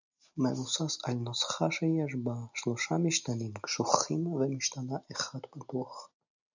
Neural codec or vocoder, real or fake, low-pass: none; real; 7.2 kHz